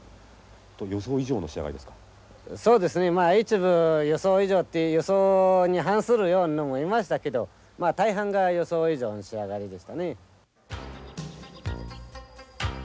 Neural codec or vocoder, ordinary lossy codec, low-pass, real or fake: none; none; none; real